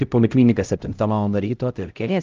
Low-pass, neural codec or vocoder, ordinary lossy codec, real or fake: 7.2 kHz; codec, 16 kHz, 0.5 kbps, X-Codec, HuBERT features, trained on LibriSpeech; Opus, 24 kbps; fake